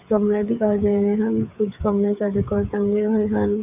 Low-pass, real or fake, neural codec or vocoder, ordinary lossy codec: 3.6 kHz; fake; codec, 16 kHz, 8 kbps, FreqCodec, smaller model; none